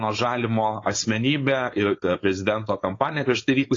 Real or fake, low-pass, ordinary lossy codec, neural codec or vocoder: fake; 7.2 kHz; AAC, 32 kbps; codec, 16 kHz, 4.8 kbps, FACodec